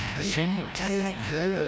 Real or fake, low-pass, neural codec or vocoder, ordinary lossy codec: fake; none; codec, 16 kHz, 0.5 kbps, FreqCodec, larger model; none